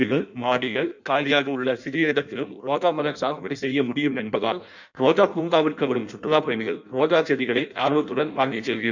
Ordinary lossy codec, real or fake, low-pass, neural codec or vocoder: none; fake; 7.2 kHz; codec, 16 kHz in and 24 kHz out, 0.6 kbps, FireRedTTS-2 codec